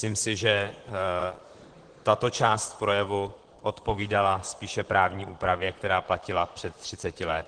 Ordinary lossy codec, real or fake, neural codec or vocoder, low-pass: Opus, 16 kbps; fake; vocoder, 44.1 kHz, 128 mel bands, Pupu-Vocoder; 9.9 kHz